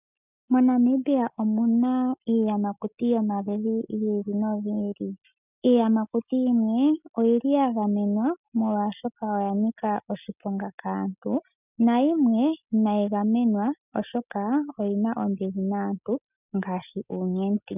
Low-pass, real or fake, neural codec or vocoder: 3.6 kHz; real; none